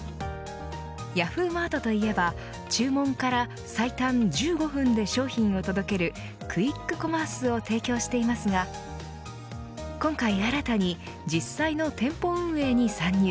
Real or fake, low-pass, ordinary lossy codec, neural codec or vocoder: real; none; none; none